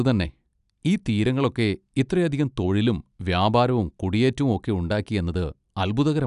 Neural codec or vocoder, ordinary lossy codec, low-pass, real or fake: none; none; 10.8 kHz; real